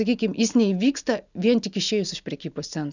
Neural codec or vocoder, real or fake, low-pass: none; real; 7.2 kHz